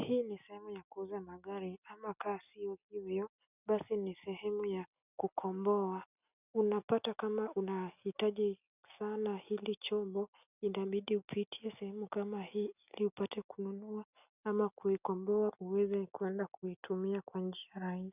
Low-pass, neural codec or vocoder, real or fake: 3.6 kHz; none; real